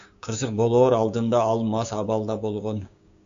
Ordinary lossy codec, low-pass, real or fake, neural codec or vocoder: AAC, 64 kbps; 7.2 kHz; fake; codec, 16 kHz, 6 kbps, DAC